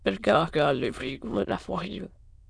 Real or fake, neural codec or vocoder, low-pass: fake; autoencoder, 22.05 kHz, a latent of 192 numbers a frame, VITS, trained on many speakers; 9.9 kHz